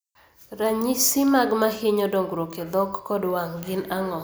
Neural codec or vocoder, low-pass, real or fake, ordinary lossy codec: none; none; real; none